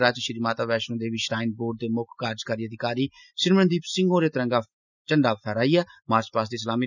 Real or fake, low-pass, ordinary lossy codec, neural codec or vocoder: real; 7.2 kHz; none; none